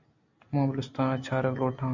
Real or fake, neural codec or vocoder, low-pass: real; none; 7.2 kHz